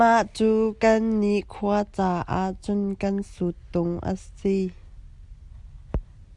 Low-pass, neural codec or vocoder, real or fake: 10.8 kHz; none; real